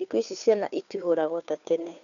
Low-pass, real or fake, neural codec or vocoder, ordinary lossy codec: 7.2 kHz; fake; codec, 16 kHz, 2 kbps, FunCodec, trained on Chinese and English, 25 frames a second; none